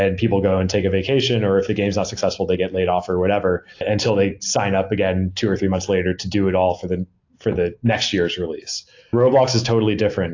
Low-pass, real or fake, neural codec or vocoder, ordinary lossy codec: 7.2 kHz; real; none; AAC, 48 kbps